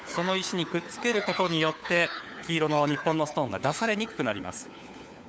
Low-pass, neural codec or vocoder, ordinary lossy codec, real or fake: none; codec, 16 kHz, 4 kbps, FunCodec, trained on LibriTTS, 50 frames a second; none; fake